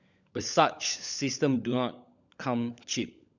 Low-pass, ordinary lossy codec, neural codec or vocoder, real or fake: 7.2 kHz; none; codec, 16 kHz, 16 kbps, FunCodec, trained on LibriTTS, 50 frames a second; fake